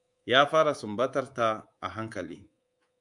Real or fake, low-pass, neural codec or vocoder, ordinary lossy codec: fake; 10.8 kHz; codec, 24 kHz, 3.1 kbps, DualCodec; AAC, 64 kbps